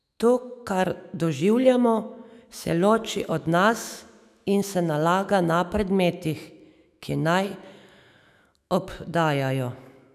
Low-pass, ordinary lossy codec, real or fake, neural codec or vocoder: 14.4 kHz; none; fake; autoencoder, 48 kHz, 128 numbers a frame, DAC-VAE, trained on Japanese speech